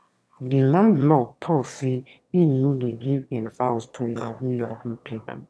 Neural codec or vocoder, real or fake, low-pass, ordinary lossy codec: autoencoder, 22.05 kHz, a latent of 192 numbers a frame, VITS, trained on one speaker; fake; none; none